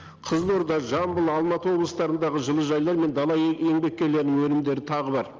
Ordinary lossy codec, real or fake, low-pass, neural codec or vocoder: Opus, 24 kbps; real; 7.2 kHz; none